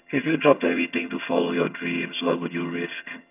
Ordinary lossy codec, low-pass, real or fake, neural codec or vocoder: none; 3.6 kHz; fake; vocoder, 22.05 kHz, 80 mel bands, HiFi-GAN